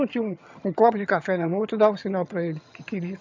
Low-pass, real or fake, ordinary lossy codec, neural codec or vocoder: 7.2 kHz; fake; MP3, 64 kbps; vocoder, 22.05 kHz, 80 mel bands, HiFi-GAN